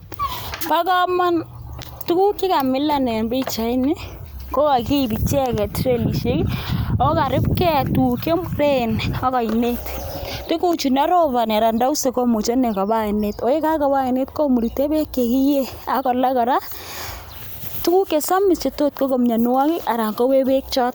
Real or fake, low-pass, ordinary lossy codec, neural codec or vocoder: real; none; none; none